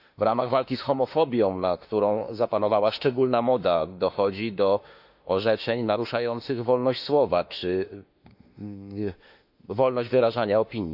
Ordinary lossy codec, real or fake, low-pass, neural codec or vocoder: none; fake; 5.4 kHz; autoencoder, 48 kHz, 32 numbers a frame, DAC-VAE, trained on Japanese speech